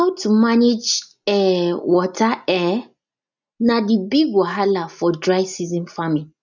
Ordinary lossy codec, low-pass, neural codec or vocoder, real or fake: none; 7.2 kHz; none; real